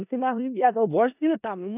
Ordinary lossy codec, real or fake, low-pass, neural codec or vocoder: none; fake; 3.6 kHz; codec, 16 kHz in and 24 kHz out, 0.4 kbps, LongCat-Audio-Codec, four codebook decoder